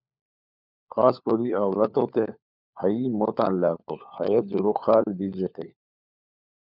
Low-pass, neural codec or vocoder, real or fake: 5.4 kHz; codec, 16 kHz, 4 kbps, FunCodec, trained on LibriTTS, 50 frames a second; fake